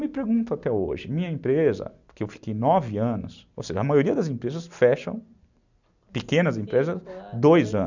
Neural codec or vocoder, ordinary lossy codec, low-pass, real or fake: none; none; 7.2 kHz; real